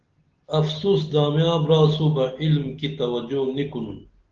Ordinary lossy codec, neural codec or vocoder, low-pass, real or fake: Opus, 16 kbps; none; 7.2 kHz; real